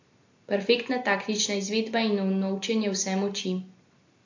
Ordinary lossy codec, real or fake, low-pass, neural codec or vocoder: AAC, 48 kbps; real; 7.2 kHz; none